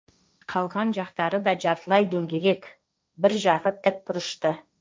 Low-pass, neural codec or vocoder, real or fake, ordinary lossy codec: none; codec, 16 kHz, 1.1 kbps, Voila-Tokenizer; fake; none